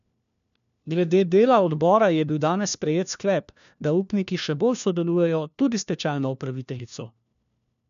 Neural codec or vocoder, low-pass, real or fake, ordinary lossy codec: codec, 16 kHz, 1 kbps, FunCodec, trained on LibriTTS, 50 frames a second; 7.2 kHz; fake; none